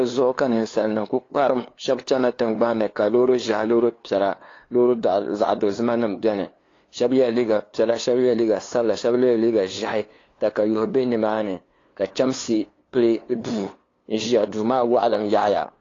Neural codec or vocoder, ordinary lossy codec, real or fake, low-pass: codec, 16 kHz, 2 kbps, FunCodec, trained on LibriTTS, 25 frames a second; AAC, 32 kbps; fake; 7.2 kHz